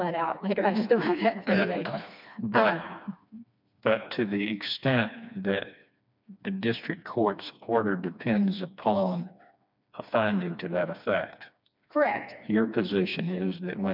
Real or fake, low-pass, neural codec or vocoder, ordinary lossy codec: fake; 5.4 kHz; codec, 16 kHz, 2 kbps, FreqCodec, smaller model; MP3, 48 kbps